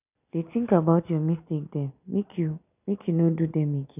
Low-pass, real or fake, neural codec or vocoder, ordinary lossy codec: 3.6 kHz; real; none; none